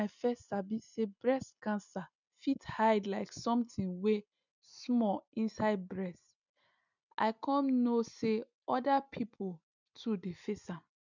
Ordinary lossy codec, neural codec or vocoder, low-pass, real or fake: none; none; 7.2 kHz; real